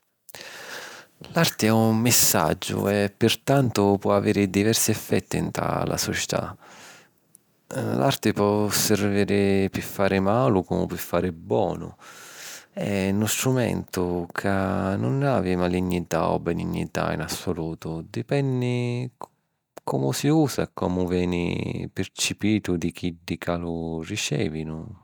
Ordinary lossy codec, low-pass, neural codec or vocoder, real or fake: none; none; none; real